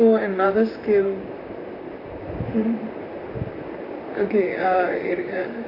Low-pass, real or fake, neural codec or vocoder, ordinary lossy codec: 5.4 kHz; fake; vocoder, 44.1 kHz, 128 mel bands, Pupu-Vocoder; AAC, 24 kbps